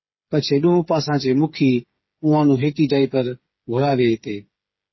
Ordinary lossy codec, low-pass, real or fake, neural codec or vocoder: MP3, 24 kbps; 7.2 kHz; fake; codec, 16 kHz, 8 kbps, FreqCodec, smaller model